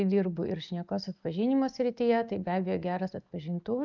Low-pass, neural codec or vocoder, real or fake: 7.2 kHz; none; real